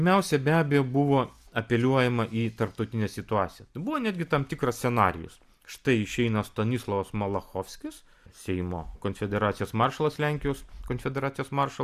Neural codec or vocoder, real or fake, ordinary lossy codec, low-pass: none; real; Opus, 64 kbps; 14.4 kHz